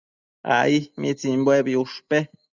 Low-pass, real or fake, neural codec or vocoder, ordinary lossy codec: 7.2 kHz; real; none; Opus, 64 kbps